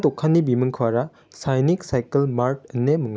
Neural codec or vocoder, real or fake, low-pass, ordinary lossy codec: none; real; none; none